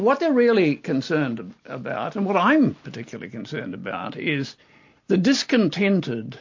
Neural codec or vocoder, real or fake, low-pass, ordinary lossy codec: none; real; 7.2 kHz; MP3, 48 kbps